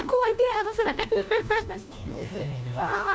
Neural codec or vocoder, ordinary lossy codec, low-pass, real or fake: codec, 16 kHz, 1 kbps, FunCodec, trained on LibriTTS, 50 frames a second; none; none; fake